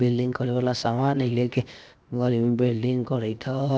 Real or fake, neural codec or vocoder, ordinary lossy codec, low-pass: fake; codec, 16 kHz, 0.7 kbps, FocalCodec; none; none